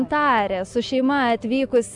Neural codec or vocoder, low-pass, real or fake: none; 10.8 kHz; real